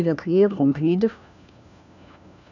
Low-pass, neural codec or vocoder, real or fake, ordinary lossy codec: 7.2 kHz; codec, 16 kHz, 1 kbps, FunCodec, trained on LibriTTS, 50 frames a second; fake; none